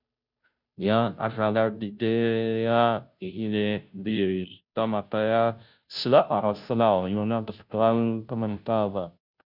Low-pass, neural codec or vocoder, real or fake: 5.4 kHz; codec, 16 kHz, 0.5 kbps, FunCodec, trained on Chinese and English, 25 frames a second; fake